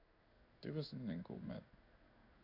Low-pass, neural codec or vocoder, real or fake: 5.4 kHz; codec, 16 kHz in and 24 kHz out, 1 kbps, XY-Tokenizer; fake